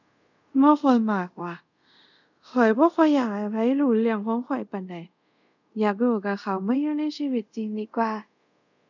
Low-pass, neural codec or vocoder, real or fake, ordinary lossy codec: 7.2 kHz; codec, 24 kHz, 0.5 kbps, DualCodec; fake; none